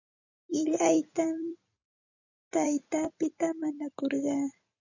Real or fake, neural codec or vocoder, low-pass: fake; vocoder, 44.1 kHz, 128 mel bands every 256 samples, BigVGAN v2; 7.2 kHz